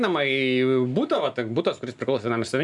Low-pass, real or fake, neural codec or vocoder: 10.8 kHz; real; none